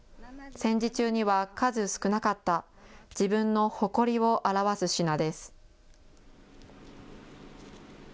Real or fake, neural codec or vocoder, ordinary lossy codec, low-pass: real; none; none; none